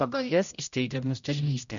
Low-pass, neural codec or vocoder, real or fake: 7.2 kHz; codec, 16 kHz, 0.5 kbps, X-Codec, HuBERT features, trained on general audio; fake